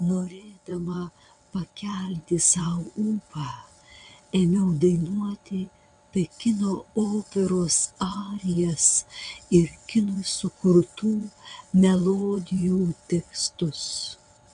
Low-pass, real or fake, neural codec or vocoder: 9.9 kHz; fake; vocoder, 22.05 kHz, 80 mel bands, WaveNeXt